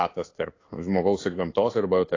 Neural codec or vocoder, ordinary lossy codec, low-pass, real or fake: codec, 16 kHz in and 24 kHz out, 1 kbps, XY-Tokenizer; AAC, 32 kbps; 7.2 kHz; fake